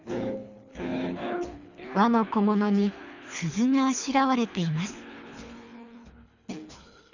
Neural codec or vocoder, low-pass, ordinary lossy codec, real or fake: codec, 24 kHz, 3 kbps, HILCodec; 7.2 kHz; none; fake